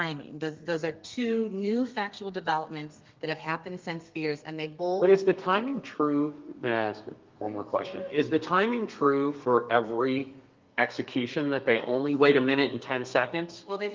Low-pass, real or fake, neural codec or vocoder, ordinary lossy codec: 7.2 kHz; fake; codec, 32 kHz, 1.9 kbps, SNAC; Opus, 32 kbps